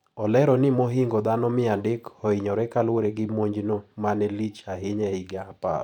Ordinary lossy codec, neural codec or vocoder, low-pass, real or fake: none; vocoder, 44.1 kHz, 128 mel bands every 512 samples, BigVGAN v2; 19.8 kHz; fake